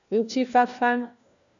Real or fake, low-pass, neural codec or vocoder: fake; 7.2 kHz; codec, 16 kHz, 1 kbps, FunCodec, trained on LibriTTS, 50 frames a second